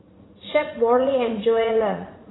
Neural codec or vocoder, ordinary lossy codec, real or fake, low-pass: vocoder, 44.1 kHz, 80 mel bands, Vocos; AAC, 16 kbps; fake; 7.2 kHz